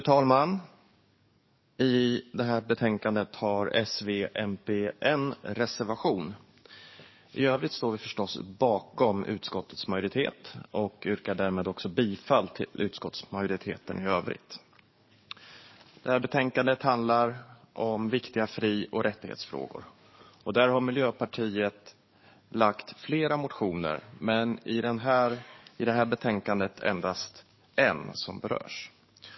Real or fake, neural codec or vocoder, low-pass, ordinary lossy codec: fake; codec, 44.1 kHz, 7.8 kbps, DAC; 7.2 kHz; MP3, 24 kbps